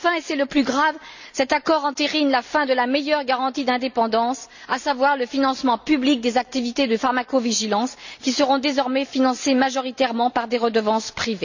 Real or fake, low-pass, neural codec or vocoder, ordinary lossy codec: real; 7.2 kHz; none; none